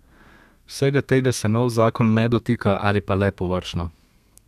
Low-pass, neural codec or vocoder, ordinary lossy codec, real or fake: 14.4 kHz; codec, 32 kHz, 1.9 kbps, SNAC; none; fake